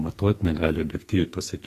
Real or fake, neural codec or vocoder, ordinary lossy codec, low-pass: fake; codec, 44.1 kHz, 2.6 kbps, SNAC; AAC, 48 kbps; 14.4 kHz